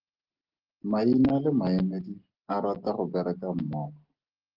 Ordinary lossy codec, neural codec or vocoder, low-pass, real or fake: Opus, 16 kbps; none; 5.4 kHz; real